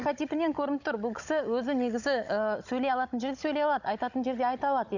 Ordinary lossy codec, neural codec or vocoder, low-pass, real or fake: none; codec, 16 kHz, 16 kbps, FunCodec, trained on Chinese and English, 50 frames a second; 7.2 kHz; fake